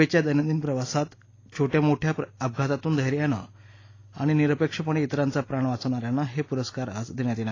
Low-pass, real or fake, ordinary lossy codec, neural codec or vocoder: 7.2 kHz; real; AAC, 32 kbps; none